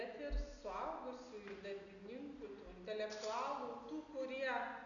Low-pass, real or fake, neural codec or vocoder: 7.2 kHz; real; none